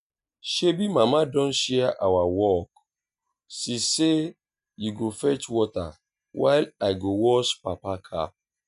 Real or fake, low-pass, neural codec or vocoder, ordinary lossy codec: real; 10.8 kHz; none; none